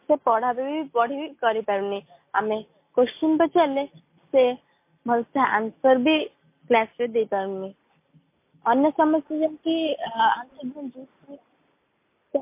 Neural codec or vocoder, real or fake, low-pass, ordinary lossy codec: none; real; 3.6 kHz; MP3, 24 kbps